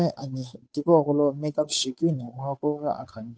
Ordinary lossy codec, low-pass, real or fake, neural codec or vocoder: none; none; fake; codec, 16 kHz, 8 kbps, FunCodec, trained on Chinese and English, 25 frames a second